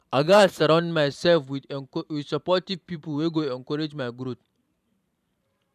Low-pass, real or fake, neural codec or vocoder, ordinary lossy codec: 14.4 kHz; fake; vocoder, 44.1 kHz, 128 mel bands every 512 samples, BigVGAN v2; none